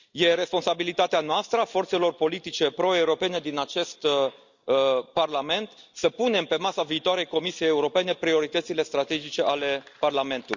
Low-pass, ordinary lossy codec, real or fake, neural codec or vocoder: 7.2 kHz; Opus, 64 kbps; real; none